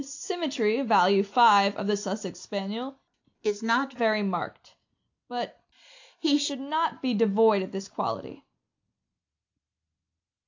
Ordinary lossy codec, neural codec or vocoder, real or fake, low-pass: AAC, 48 kbps; none; real; 7.2 kHz